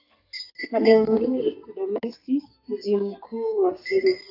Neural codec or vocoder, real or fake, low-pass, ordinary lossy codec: codec, 32 kHz, 1.9 kbps, SNAC; fake; 5.4 kHz; AAC, 32 kbps